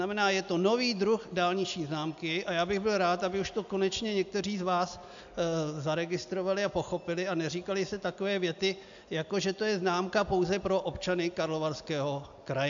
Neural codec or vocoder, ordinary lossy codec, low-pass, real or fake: none; AAC, 64 kbps; 7.2 kHz; real